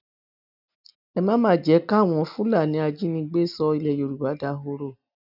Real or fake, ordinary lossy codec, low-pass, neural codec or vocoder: real; none; 5.4 kHz; none